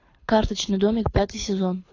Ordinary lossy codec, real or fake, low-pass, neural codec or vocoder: AAC, 32 kbps; fake; 7.2 kHz; codec, 24 kHz, 6 kbps, HILCodec